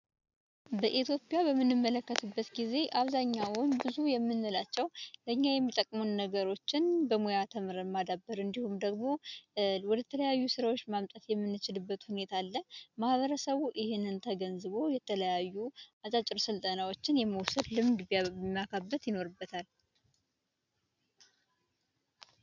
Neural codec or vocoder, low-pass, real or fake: none; 7.2 kHz; real